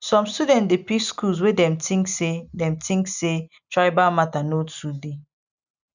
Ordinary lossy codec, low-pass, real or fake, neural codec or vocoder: none; 7.2 kHz; real; none